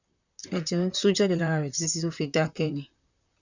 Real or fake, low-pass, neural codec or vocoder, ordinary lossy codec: fake; 7.2 kHz; vocoder, 44.1 kHz, 128 mel bands, Pupu-Vocoder; none